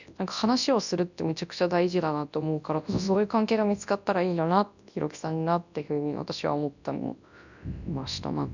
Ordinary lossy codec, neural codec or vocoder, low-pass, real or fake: none; codec, 24 kHz, 0.9 kbps, WavTokenizer, large speech release; 7.2 kHz; fake